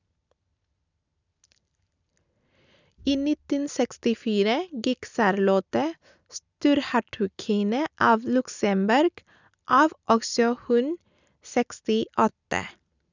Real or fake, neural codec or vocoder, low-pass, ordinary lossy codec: real; none; 7.2 kHz; none